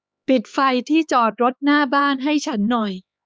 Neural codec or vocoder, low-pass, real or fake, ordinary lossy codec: codec, 16 kHz, 4 kbps, X-Codec, HuBERT features, trained on LibriSpeech; none; fake; none